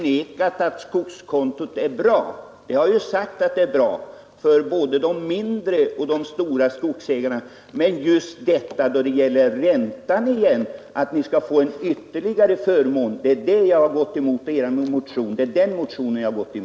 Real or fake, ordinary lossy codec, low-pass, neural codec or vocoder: real; none; none; none